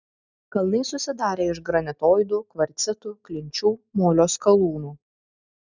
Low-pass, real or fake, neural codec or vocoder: 7.2 kHz; real; none